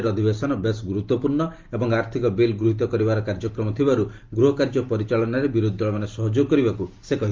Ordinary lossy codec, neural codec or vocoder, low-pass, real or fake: Opus, 32 kbps; none; 7.2 kHz; real